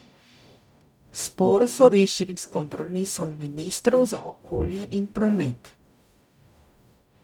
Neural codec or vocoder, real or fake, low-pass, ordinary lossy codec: codec, 44.1 kHz, 0.9 kbps, DAC; fake; 19.8 kHz; none